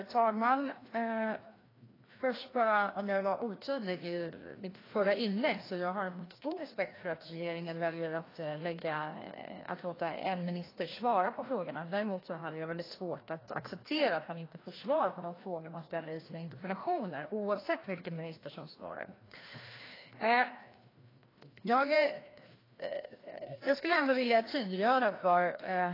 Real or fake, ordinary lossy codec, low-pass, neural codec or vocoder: fake; AAC, 24 kbps; 5.4 kHz; codec, 16 kHz, 1 kbps, FreqCodec, larger model